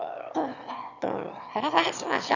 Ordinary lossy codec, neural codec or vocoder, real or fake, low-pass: none; autoencoder, 22.05 kHz, a latent of 192 numbers a frame, VITS, trained on one speaker; fake; 7.2 kHz